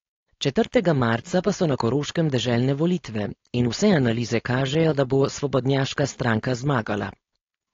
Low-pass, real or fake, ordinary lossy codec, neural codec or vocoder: 7.2 kHz; fake; AAC, 32 kbps; codec, 16 kHz, 4.8 kbps, FACodec